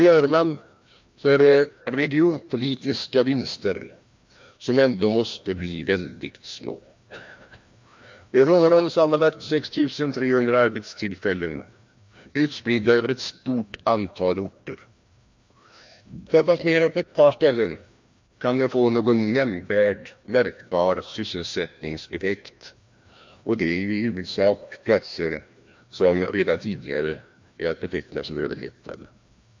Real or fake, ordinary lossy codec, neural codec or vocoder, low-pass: fake; MP3, 48 kbps; codec, 16 kHz, 1 kbps, FreqCodec, larger model; 7.2 kHz